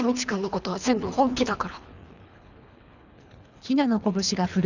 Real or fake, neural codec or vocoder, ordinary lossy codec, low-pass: fake; codec, 24 kHz, 3 kbps, HILCodec; none; 7.2 kHz